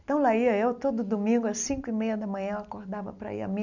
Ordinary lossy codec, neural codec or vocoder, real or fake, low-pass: none; none; real; 7.2 kHz